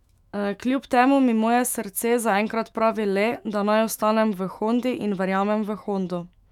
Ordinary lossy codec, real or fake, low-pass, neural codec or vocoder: none; fake; 19.8 kHz; autoencoder, 48 kHz, 128 numbers a frame, DAC-VAE, trained on Japanese speech